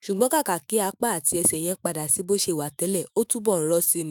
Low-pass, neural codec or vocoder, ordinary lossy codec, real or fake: none; autoencoder, 48 kHz, 128 numbers a frame, DAC-VAE, trained on Japanese speech; none; fake